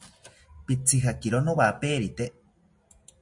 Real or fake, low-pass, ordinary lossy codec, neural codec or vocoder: real; 10.8 kHz; MP3, 48 kbps; none